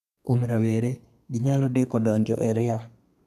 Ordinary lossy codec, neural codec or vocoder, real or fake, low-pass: none; codec, 32 kHz, 1.9 kbps, SNAC; fake; 14.4 kHz